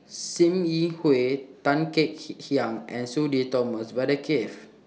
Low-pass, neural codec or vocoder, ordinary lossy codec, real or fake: none; none; none; real